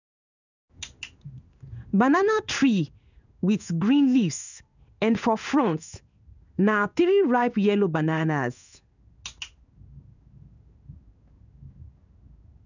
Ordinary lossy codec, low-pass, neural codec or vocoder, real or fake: none; 7.2 kHz; codec, 16 kHz in and 24 kHz out, 1 kbps, XY-Tokenizer; fake